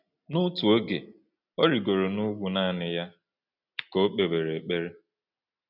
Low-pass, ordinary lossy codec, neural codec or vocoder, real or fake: 5.4 kHz; none; none; real